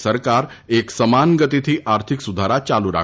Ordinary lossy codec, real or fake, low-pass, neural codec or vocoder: none; real; none; none